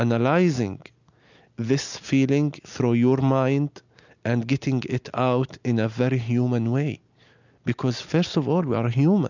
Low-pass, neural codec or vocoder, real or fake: 7.2 kHz; none; real